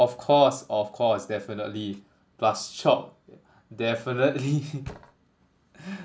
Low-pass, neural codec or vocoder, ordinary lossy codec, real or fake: none; none; none; real